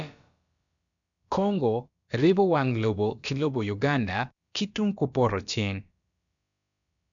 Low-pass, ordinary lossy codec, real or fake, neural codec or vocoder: 7.2 kHz; none; fake; codec, 16 kHz, about 1 kbps, DyCAST, with the encoder's durations